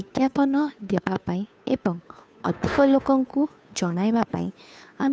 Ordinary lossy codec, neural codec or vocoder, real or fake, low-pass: none; codec, 16 kHz, 2 kbps, FunCodec, trained on Chinese and English, 25 frames a second; fake; none